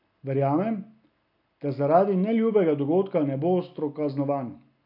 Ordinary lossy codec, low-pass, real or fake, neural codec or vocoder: none; 5.4 kHz; real; none